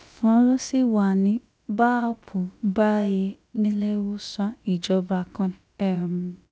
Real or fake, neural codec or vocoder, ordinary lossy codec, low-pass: fake; codec, 16 kHz, about 1 kbps, DyCAST, with the encoder's durations; none; none